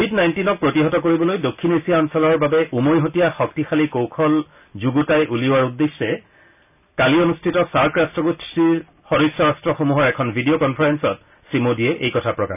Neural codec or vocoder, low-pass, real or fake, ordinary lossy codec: none; 3.6 kHz; real; none